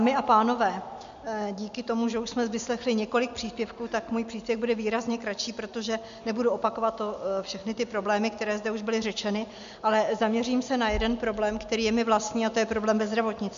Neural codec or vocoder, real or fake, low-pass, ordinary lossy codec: none; real; 7.2 kHz; MP3, 64 kbps